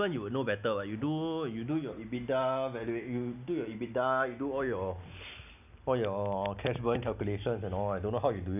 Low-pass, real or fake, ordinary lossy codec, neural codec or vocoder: 3.6 kHz; real; none; none